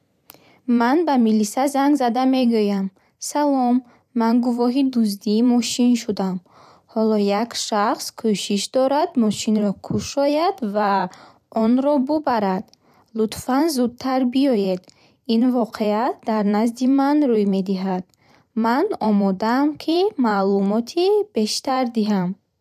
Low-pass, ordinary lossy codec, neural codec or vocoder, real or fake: 14.4 kHz; none; vocoder, 44.1 kHz, 128 mel bands every 512 samples, BigVGAN v2; fake